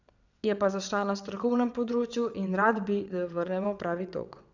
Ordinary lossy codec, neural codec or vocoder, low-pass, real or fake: none; vocoder, 22.05 kHz, 80 mel bands, WaveNeXt; 7.2 kHz; fake